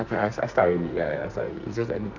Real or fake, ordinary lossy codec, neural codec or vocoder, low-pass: fake; none; codec, 44.1 kHz, 2.6 kbps, SNAC; 7.2 kHz